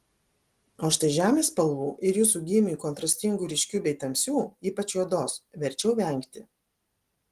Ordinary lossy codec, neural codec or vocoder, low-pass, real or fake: Opus, 24 kbps; none; 14.4 kHz; real